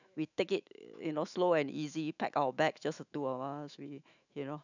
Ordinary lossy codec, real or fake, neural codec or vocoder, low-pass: none; fake; autoencoder, 48 kHz, 128 numbers a frame, DAC-VAE, trained on Japanese speech; 7.2 kHz